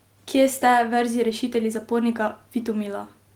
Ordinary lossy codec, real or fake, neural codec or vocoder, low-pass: Opus, 24 kbps; real; none; 19.8 kHz